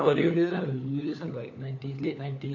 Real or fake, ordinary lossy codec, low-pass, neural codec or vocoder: fake; none; 7.2 kHz; codec, 16 kHz, 4 kbps, FunCodec, trained on LibriTTS, 50 frames a second